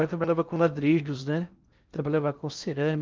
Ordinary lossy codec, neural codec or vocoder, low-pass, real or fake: Opus, 32 kbps; codec, 16 kHz in and 24 kHz out, 0.6 kbps, FocalCodec, streaming, 4096 codes; 7.2 kHz; fake